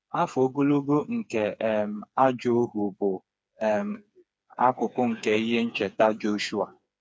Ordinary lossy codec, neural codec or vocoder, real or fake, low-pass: none; codec, 16 kHz, 4 kbps, FreqCodec, smaller model; fake; none